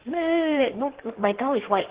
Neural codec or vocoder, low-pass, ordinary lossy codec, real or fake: codec, 16 kHz in and 24 kHz out, 1.1 kbps, FireRedTTS-2 codec; 3.6 kHz; Opus, 16 kbps; fake